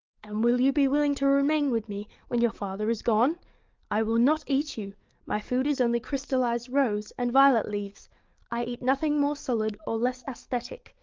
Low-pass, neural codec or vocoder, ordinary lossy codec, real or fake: 7.2 kHz; codec, 44.1 kHz, 7.8 kbps, DAC; Opus, 24 kbps; fake